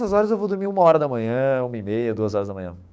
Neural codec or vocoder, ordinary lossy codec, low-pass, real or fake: codec, 16 kHz, 6 kbps, DAC; none; none; fake